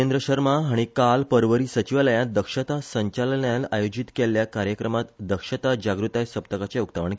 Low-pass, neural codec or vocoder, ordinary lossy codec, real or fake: none; none; none; real